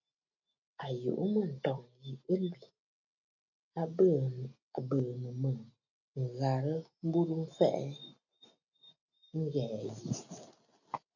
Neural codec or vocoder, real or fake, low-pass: none; real; 7.2 kHz